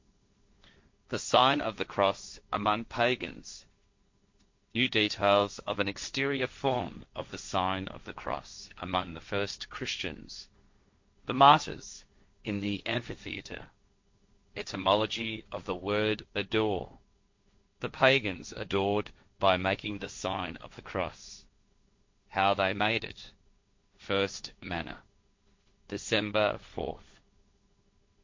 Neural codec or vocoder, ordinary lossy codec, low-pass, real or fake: codec, 16 kHz, 1.1 kbps, Voila-Tokenizer; MP3, 48 kbps; 7.2 kHz; fake